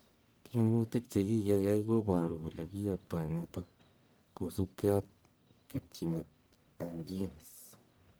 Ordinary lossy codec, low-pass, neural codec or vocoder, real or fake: none; none; codec, 44.1 kHz, 1.7 kbps, Pupu-Codec; fake